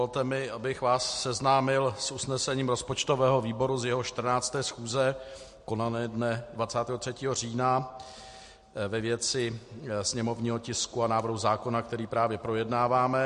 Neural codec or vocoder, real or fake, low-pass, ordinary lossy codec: none; real; 14.4 kHz; MP3, 48 kbps